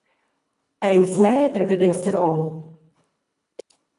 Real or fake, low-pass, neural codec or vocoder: fake; 10.8 kHz; codec, 24 kHz, 1.5 kbps, HILCodec